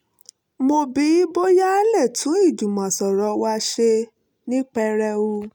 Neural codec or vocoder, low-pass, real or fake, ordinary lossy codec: none; 19.8 kHz; real; none